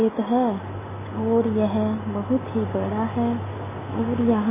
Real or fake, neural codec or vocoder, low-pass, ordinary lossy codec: real; none; 3.6 kHz; MP3, 16 kbps